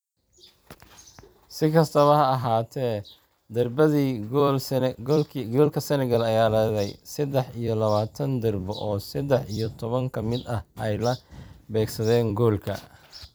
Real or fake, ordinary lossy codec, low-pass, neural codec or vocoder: fake; none; none; vocoder, 44.1 kHz, 128 mel bands every 256 samples, BigVGAN v2